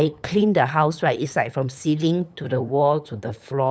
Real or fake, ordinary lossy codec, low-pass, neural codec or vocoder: fake; none; none; codec, 16 kHz, 8 kbps, FunCodec, trained on LibriTTS, 25 frames a second